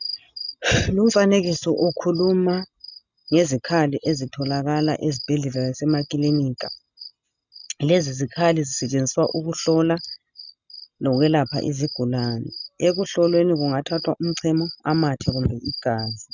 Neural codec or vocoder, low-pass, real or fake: none; 7.2 kHz; real